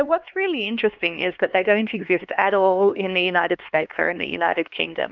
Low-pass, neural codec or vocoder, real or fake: 7.2 kHz; codec, 16 kHz, 2 kbps, X-Codec, HuBERT features, trained on LibriSpeech; fake